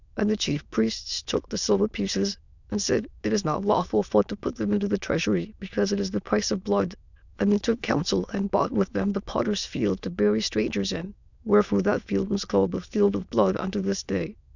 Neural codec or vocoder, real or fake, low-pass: autoencoder, 22.05 kHz, a latent of 192 numbers a frame, VITS, trained on many speakers; fake; 7.2 kHz